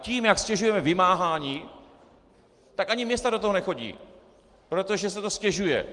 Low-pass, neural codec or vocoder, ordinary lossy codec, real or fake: 10.8 kHz; none; Opus, 24 kbps; real